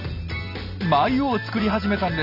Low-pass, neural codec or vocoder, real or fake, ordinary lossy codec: 5.4 kHz; none; real; none